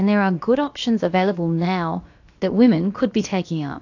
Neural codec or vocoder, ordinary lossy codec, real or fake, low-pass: codec, 16 kHz, about 1 kbps, DyCAST, with the encoder's durations; AAC, 48 kbps; fake; 7.2 kHz